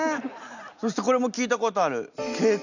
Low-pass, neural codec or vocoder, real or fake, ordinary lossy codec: 7.2 kHz; none; real; none